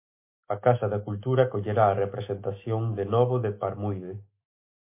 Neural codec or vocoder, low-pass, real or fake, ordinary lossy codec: autoencoder, 48 kHz, 128 numbers a frame, DAC-VAE, trained on Japanese speech; 3.6 kHz; fake; MP3, 24 kbps